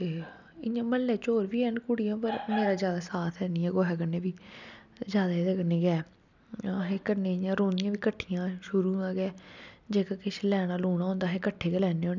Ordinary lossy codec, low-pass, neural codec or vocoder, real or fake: none; 7.2 kHz; none; real